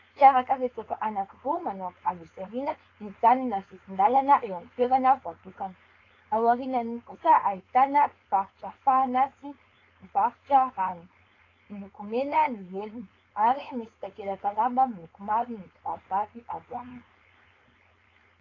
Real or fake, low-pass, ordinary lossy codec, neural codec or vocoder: fake; 7.2 kHz; AAC, 32 kbps; codec, 16 kHz, 4.8 kbps, FACodec